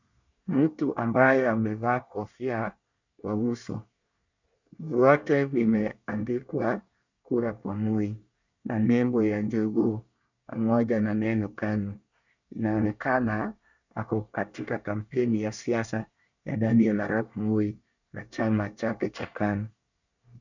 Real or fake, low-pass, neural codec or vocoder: fake; 7.2 kHz; codec, 24 kHz, 1 kbps, SNAC